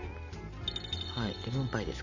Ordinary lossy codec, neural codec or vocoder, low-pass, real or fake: none; vocoder, 44.1 kHz, 128 mel bands every 512 samples, BigVGAN v2; 7.2 kHz; fake